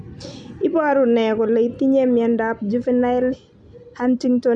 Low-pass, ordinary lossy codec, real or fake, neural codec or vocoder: 9.9 kHz; none; real; none